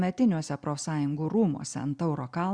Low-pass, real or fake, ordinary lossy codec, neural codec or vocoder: 9.9 kHz; real; Opus, 64 kbps; none